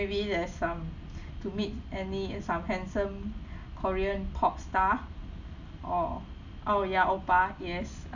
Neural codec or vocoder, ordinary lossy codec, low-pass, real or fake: none; none; 7.2 kHz; real